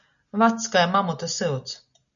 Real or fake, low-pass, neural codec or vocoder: real; 7.2 kHz; none